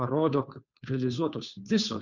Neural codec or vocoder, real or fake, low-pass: codec, 16 kHz, 2 kbps, FunCodec, trained on Chinese and English, 25 frames a second; fake; 7.2 kHz